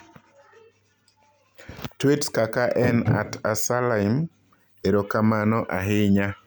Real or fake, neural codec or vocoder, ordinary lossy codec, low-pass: real; none; none; none